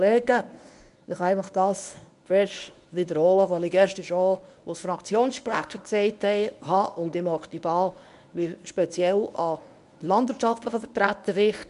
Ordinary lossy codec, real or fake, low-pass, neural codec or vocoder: none; fake; 10.8 kHz; codec, 24 kHz, 0.9 kbps, WavTokenizer, medium speech release version 1